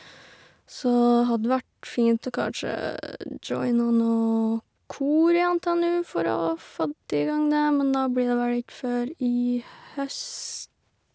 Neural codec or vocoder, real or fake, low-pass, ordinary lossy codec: none; real; none; none